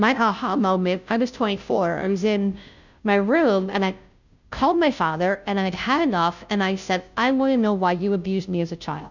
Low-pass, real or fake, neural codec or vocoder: 7.2 kHz; fake; codec, 16 kHz, 0.5 kbps, FunCodec, trained on Chinese and English, 25 frames a second